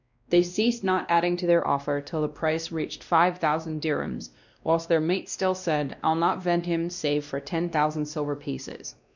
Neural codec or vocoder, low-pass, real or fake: codec, 16 kHz, 1 kbps, X-Codec, WavLM features, trained on Multilingual LibriSpeech; 7.2 kHz; fake